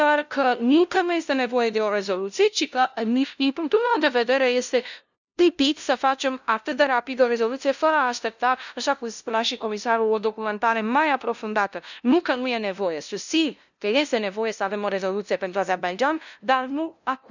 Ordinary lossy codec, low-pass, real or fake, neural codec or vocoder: none; 7.2 kHz; fake; codec, 16 kHz, 0.5 kbps, FunCodec, trained on LibriTTS, 25 frames a second